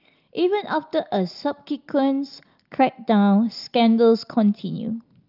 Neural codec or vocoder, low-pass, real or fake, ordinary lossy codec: none; 5.4 kHz; real; Opus, 24 kbps